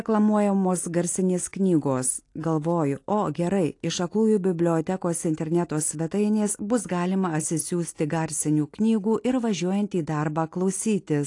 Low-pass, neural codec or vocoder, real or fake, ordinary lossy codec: 10.8 kHz; none; real; AAC, 48 kbps